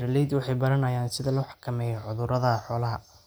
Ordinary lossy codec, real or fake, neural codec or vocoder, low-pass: none; real; none; none